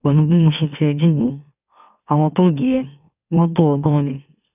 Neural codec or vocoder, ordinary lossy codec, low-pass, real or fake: autoencoder, 44.1 kHz, a latent of 192 numbers a frame, MeloTTS; none; 3.6 kHz; fake